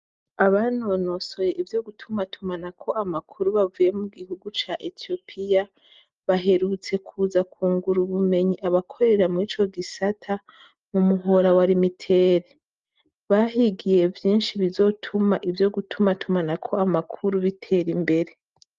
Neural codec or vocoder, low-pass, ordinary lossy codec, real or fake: none; 7.2 kHz; Opus, 32 kbps; real